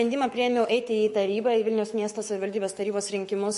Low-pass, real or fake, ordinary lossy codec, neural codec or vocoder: 14.4 kHz; fake; MP3, 48 kbps; codec, 44.1 kHz, 7.8 kbps, Pupu-Codec